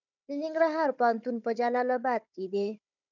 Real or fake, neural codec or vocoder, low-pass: fake; codec, 16 kHz, 4 kbps, FunCodec, trained on Chinese and English, 50 frames a second; 7.2 kHz